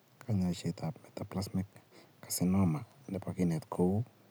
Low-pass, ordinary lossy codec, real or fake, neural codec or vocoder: none; none; real; none